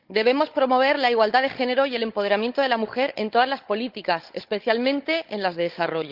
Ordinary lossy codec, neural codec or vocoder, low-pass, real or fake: Opus, 32 kbps; codec, 16 kHz, 16 kbps, FunCodec, trained on Chinese and English, 50 frames a second; 5.4 kHz; fake